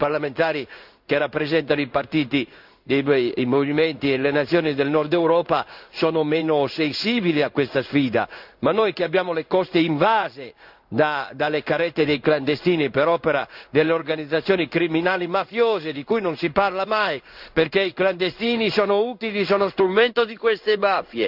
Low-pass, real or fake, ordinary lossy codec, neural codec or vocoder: 5.4 kHz; fake; none; codec, 16 kHz in and 24 kHz out, 1 kbps, XY-Tokenizer